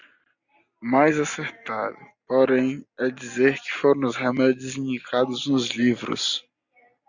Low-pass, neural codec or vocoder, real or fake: 7.2 kHz; none; real